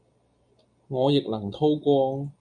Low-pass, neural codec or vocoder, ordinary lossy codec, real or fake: 9.9 kHz; none; MP3, 96 kbps; real